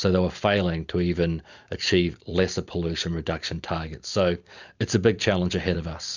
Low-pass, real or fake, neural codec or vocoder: 7.2 kHz; real; none